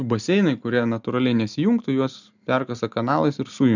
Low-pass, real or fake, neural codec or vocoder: 7.2 kHz; real; none